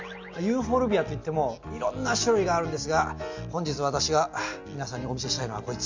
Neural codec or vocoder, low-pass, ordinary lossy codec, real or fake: none; 7.2 kHz; MP3, 64 kbps; real